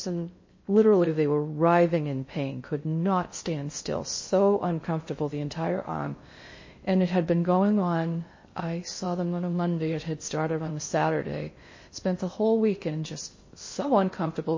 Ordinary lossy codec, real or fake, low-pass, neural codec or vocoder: MP3, 32 kbps; fake; 7.2 kHz; codec, 16 kHz in and 24 kHz out, 0.6 kbps, FocalCodec, streaming, 2048 codes